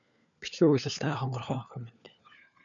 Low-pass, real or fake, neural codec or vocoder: 7.2 kHz; fake; codec, 16 kHz, 4 kbps, FunCodec, trained on LibriTTS, 50 frames a second